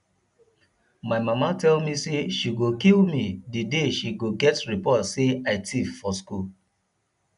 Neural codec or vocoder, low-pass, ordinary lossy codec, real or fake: none; 10.8 kHz; none; real